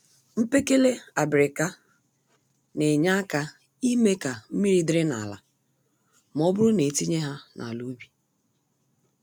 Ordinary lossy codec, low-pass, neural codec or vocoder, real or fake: none; none; none; real